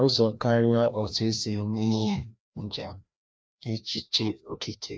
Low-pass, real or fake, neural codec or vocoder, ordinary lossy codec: none; fake; codec, 16 kHz, 1 kbps, FreqCodec, larger model; none